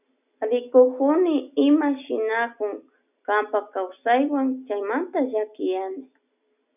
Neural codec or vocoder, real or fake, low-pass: none; real; 3.6 kHz